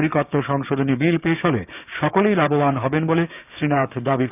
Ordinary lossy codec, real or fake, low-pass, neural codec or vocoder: none; fake; 3.6 kHz; codec, 16 kHz, 6 kbps, DAC